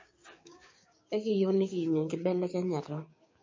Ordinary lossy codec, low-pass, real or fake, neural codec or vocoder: MP3, 32 kbps; 7.2 kHz; fake; codec, 16 kHz, 6 kbps, DAC